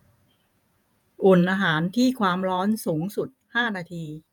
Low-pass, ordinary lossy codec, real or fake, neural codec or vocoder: none; none; fake; vocoder, 48 kHz, 128 mel bands, Vocos